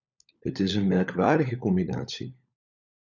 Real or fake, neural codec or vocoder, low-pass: fake; codec, 16 kHz, 16 kbps, FunCodec, trained on LibriTTS, 50 frames a second; 7.2 kHz